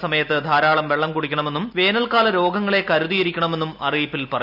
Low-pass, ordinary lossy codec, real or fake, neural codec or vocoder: 5.4 kHz; AAC, 48 kbps; real; none